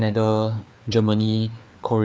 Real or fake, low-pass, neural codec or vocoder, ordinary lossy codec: fake; none; codec, 16 kHz, 4 kbps, FreqCodec, larger model; none